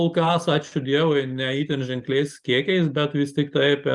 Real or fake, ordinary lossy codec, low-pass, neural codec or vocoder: real; Opus, 32 kbps; 10.8 kHz; none